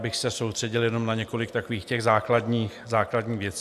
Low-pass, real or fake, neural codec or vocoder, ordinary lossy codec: 14.4 kHz; real; none; AAC, 96 kbps